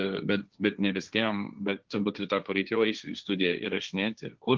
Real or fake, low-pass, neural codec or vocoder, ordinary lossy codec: fake; 7.2 kHz; codec, 16 kHz, 1.1 kbps, Voila-Tokenizer; Opus, 32 kbps